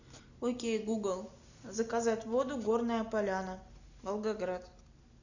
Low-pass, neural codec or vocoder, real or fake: 7.2 kHz; none; real